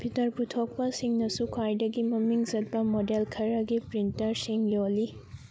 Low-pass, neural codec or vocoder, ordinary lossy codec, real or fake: none; none; none; real